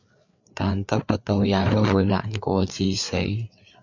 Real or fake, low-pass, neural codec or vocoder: fake; 7.2 kHz; codec, 16 kHz, 4 kbps, FreqCodec, larger model